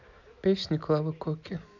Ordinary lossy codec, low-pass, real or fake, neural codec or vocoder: none; 7.2 kHz; real; none